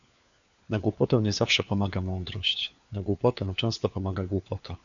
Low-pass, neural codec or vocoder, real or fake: 7.2 kHz; codec, 16 kHz, 4 kbps, FunCodec, trained on LibriTTS, 50 frames a second; fake